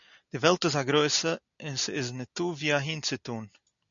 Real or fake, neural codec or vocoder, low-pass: real; none; 7.2 kHz